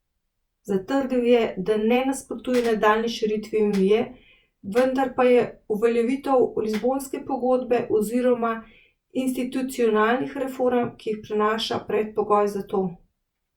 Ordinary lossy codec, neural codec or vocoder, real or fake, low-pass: Opus, 64 kbps; vocoder, 48 kHz, 128 mel bands, Vocos; fake; 19.8 kHz